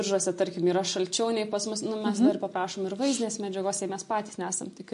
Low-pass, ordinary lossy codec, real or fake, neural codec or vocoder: 10.8 kHz; MP3, 48 kbps; real; none